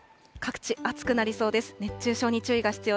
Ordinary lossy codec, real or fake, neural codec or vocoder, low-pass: none; real; none; none